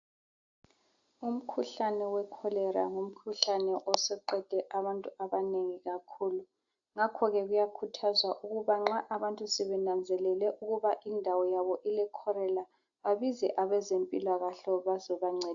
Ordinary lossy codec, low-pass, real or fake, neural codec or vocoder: AAC, 64 kbps; 7.2 kHz; real; none